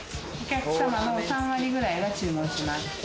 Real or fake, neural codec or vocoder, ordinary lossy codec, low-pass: real; none; none; none